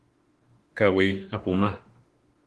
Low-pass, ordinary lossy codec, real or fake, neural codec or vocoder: 10.8 kHz; Opus, 16 kbps; fake; autoencoder, 48 kHz, 32 numbers a frame, DAC-VAE, trained on Japanese speech